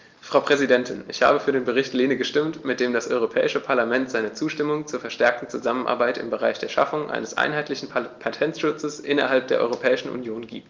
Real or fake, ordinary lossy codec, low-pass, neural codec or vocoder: real; Opus, 32 kbps; 7.2 kHz; none